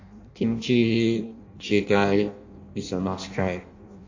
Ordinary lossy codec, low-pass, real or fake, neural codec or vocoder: AAC, 48 kbps; 7.2 kHz; fake; codec, 16 kHz in and 24 kHz out, 0.6 kbps, FireRedTTS-2 codec